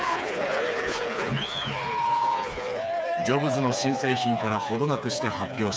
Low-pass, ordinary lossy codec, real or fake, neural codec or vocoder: none; none; fake; codec, 16 kHz, 4 kbps, FreqCodec, smaller model